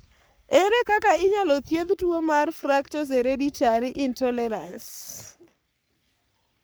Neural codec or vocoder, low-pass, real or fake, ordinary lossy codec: codec, 44.1 kHz, 3.4 kbps, Pupu-Codec; none; fake; none